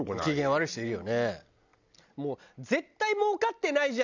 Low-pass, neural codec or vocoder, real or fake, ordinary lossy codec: 7.2 kHz; none; real; none